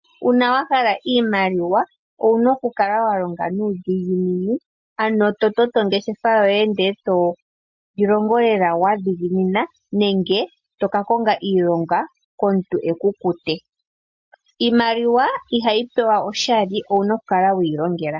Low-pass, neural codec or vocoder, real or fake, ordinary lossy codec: 7.2 kHz; none; real; MP3, 48 kbps